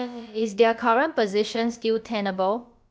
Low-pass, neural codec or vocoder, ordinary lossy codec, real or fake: none; codec, 16 kHz, about 1 kbps, DyCAST, with the encoder's durations; none; fake